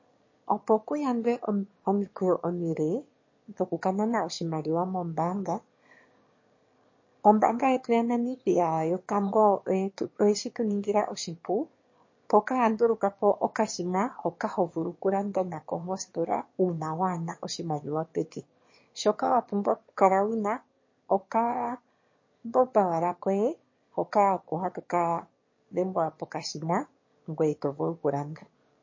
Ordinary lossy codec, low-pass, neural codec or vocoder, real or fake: MP3, 32 kbps; 7.2 kHz; autoencoder, 22.05 kHz, a latent of 192 numbers a frame, VITS, trained on one speaker; fake